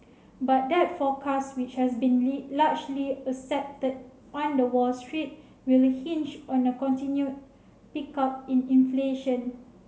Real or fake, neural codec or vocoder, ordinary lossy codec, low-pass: real; none; none; none